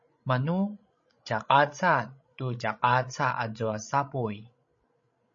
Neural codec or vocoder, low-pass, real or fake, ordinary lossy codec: codec, 16 kHz, 16 kbps, FreqCodec, larger model; 7.2 kHz; fake; MP3, 32 kbps